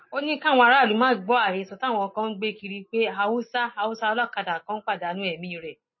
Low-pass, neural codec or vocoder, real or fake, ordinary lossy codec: 7.2 kHz; none; real; MP3, 24 kbps